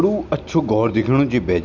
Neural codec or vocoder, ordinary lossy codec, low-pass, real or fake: none; none; 7.2 kHz; real